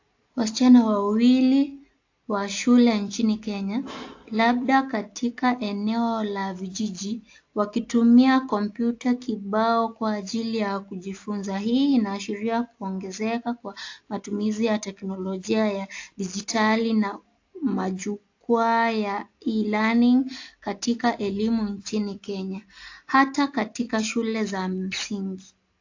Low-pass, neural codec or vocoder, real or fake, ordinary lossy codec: 7.2 kHz; none; real; AAC, 48 kbps